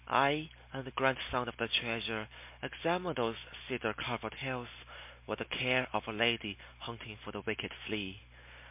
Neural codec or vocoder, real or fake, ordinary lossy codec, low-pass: none; real; MP3, 32 kbps; 3.6 kHz